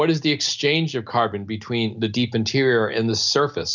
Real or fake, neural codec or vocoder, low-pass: real; none; 7.2 kHz